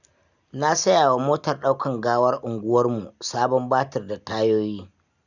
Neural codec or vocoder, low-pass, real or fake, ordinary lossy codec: none; 7.2 kHz; real; none